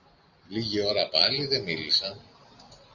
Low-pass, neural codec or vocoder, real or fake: 7.2 kHz; none; real